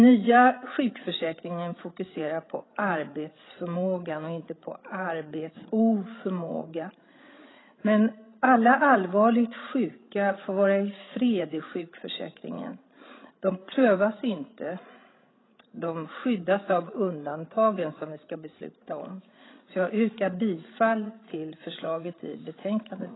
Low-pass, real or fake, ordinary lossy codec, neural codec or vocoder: 7.2 kHz; fake; AAC, 16 kbps; codec, 16 kHz, 16 kbps, FreqCodec, larger model